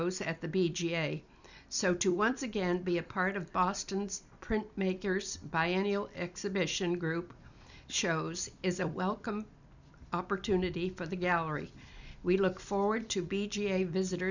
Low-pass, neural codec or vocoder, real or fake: 7.2 kHz; vocoder, 44.1 kHz, 128 mel bands every 256 samples, BigVGAN v2; fake